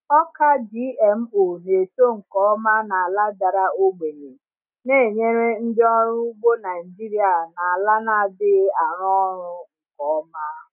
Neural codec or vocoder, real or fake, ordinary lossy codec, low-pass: none; real; none; 3.6 kHz